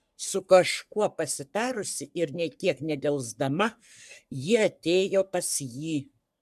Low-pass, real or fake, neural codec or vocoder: 14.4 kHz; fake; codec, 44.1 kHz, 3.4 kbps, Pupu-Codec